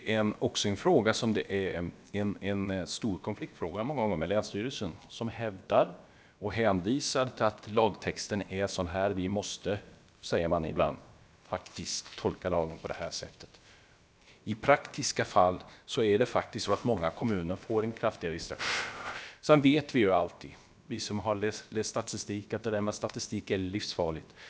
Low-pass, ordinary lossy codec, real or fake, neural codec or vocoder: none; none; fake; codec, 16 kHz, about 1 kbps, DyCAST, with the encoder's durations